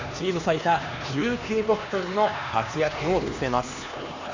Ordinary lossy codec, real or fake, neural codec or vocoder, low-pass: AAC, 48 kbps; fake; codec, 16 kHz, 2 kbps, X-Codec, HuBERT features, trained on LibriSpeech; 7.2 kHz